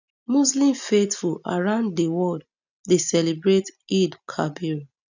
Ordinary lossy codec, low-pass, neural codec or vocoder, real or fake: none; 7.2 kHz; none; real